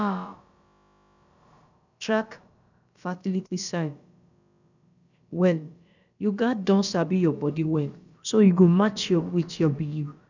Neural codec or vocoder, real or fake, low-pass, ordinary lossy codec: codec, 16 kHz, about 1 kbps, DyCAST, with the encoder's durations; fake; 7.2 kHz; none